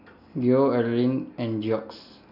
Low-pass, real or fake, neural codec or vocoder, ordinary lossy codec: 5.4 kHz; real; none; none